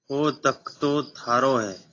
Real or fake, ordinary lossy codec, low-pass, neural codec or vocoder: real; AAC, 32 kbps; 7.2 kHz; none